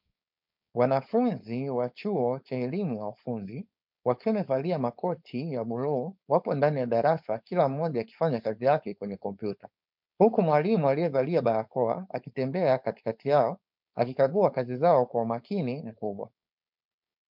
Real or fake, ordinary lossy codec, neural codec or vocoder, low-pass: fake; MP3, 48 kbps; codec, 16 kHz, 4.8 kbps, FACodec; 5.4 kHz